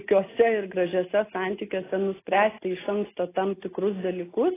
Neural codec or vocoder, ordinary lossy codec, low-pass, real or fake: none; AAC, 16 kbps; 3.6 kHz; real